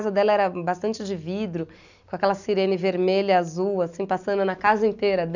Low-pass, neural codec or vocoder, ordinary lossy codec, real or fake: 7.2 kHz; none; none; real